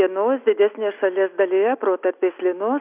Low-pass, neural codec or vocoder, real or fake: 3.6 kHz; none; real